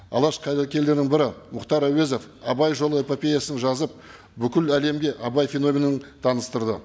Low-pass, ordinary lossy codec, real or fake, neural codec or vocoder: none; none; real; none